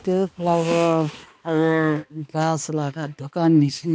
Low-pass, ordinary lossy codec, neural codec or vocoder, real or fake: none; none; codec, 16 kHz, 2 kbps, X-Codec, HuBERT features, trained on balanced general audio; fake